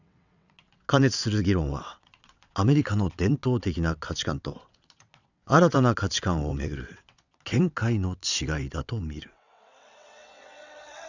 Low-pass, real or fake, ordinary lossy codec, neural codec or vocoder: 7.2 kHz; fake; none; vocoder, 22.05 kHz, 80 mel bands, WaveNeXt